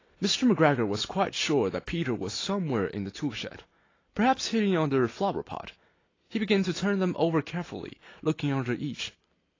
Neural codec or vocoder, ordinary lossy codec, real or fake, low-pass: none; AAC, 32 kbps; real; 7.2 kHz